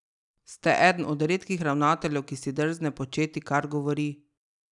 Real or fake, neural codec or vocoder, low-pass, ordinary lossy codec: real; none; 10.8 kHz; none